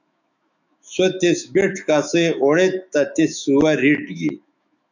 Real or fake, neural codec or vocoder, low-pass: fake; autoencoder, 48 kHz, 128 numbers a frame, DAC-VAE, trained on Japanese speech; 7.2 kHz